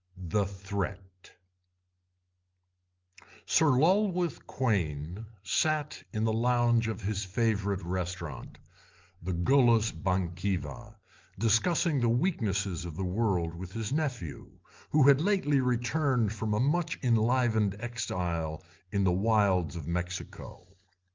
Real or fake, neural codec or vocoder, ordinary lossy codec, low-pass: real; none; Opus, 24 kbps; 7.2 kHz